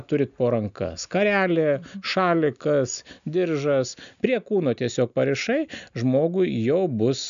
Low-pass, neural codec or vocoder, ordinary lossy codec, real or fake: 7.2 kHz; none; AAC, 96 kbps; real